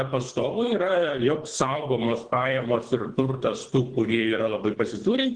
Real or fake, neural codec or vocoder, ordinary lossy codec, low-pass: fake; codec, 24 kHz, 3 kbps, HILCodec; Opus, 16 kbps; 9.9 kHz